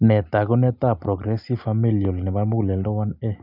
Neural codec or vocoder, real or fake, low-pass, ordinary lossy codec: none; real; 5.4 kHz; none